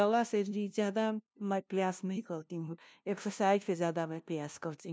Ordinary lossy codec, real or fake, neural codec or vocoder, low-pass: none; fake; codec, 16 kHz, 0.5 kbps, FunCodec, trained on LibriTTS, 25 frames a second; none